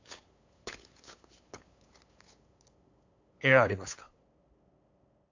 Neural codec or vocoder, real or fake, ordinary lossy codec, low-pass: codec, 16 kHz, 8 kbps, FunCodec, trained on LibriTTS, 25 frames a second; fake; AAC, 48 kbps; 7.2 kHz